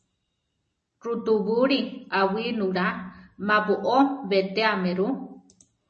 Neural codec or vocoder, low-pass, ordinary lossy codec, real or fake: none; 10.8 kHz; MP3, 32 kbps; real